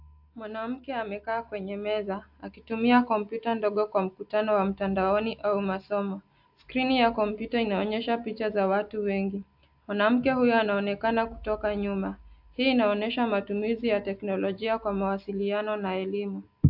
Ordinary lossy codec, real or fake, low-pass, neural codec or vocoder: AAC, 48 kbps; real; 5.4 kHz; none